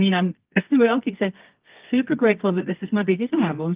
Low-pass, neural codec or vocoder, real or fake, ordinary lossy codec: 3.6 kHz; codec, 24 kHz, 0.9 kbps, WavTokenizer, medium music audio release; fake; Opus, 32 kbps